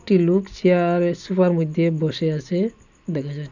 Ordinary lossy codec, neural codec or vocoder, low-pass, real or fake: none; none; 7.2 kHz; real